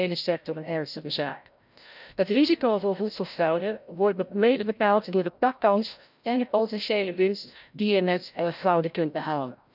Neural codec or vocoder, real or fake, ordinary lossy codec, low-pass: codec, 16 kHz, 0.5 kbps, FreqCodec, larger model; fake; none; 5.4 kHz